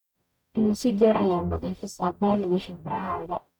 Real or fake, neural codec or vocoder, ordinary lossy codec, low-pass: fake; codec, 44.1 kHz, 0.9 kbps, DAC; none; 19.8 kHz